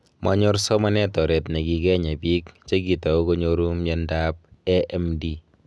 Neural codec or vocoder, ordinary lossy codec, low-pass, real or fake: none; none; none; real